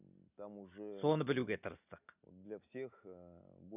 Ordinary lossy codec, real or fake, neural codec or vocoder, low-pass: none; real; none; 3.6 kHz